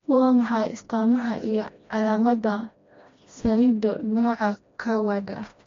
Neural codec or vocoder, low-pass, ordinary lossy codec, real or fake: codec, 16 kHz, 1 kbps, FreqCodec, smaller model; 7.2 kHz; MP3, 48 kbps; fake